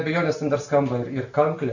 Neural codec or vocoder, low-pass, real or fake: none; 7.2 kHz; real